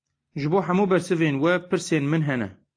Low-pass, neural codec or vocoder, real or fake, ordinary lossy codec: 9.9 kHz; none; real; AAC, 48 kbps